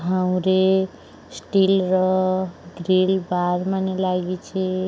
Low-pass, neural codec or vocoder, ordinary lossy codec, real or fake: none; none; none; real